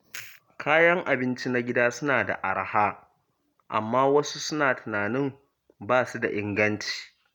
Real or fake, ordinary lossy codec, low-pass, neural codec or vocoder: real; none; 19.8 kHz; none